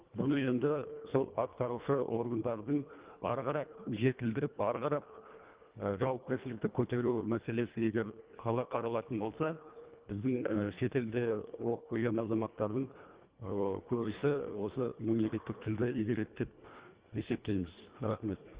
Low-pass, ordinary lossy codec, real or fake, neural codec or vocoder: 3.6 kHz; Opus, 32 kbps; fake; codec, 24 kHz, 1.5 kbps, HILCodec